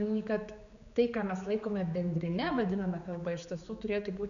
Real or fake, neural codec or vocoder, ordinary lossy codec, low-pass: fake; codec, 16 kHz, 4 kbps, X-Codec, HuBERT features, trained on general audio; MP3, 96 kbps; 7.2 kHz